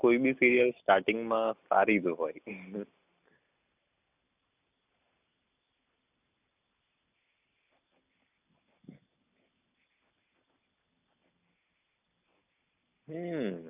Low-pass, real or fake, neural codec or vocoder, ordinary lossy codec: 3.6 kHz; real; none; none